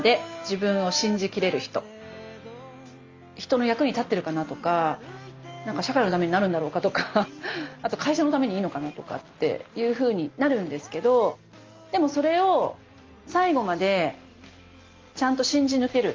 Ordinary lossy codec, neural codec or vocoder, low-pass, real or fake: Opus, 32 kbps; none; 7.2 kHz; real